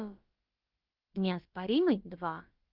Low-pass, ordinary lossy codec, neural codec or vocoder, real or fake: 5.4 kHz; Opus, 32 kbps; codec, 16 kHz, about 1 kbps, DyCAST, with the encoder's durations; fake